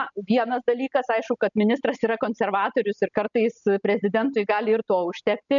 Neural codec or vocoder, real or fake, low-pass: none; real; 7.2 kHz